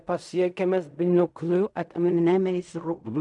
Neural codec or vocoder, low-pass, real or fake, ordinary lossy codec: codec, 16 kHz in and 24 kHz out, 0.4 kbps, LongCat-Audio-Codec, fine tuned four codebook decoder; 10.8 kHz; fake; MP3, 96 kbps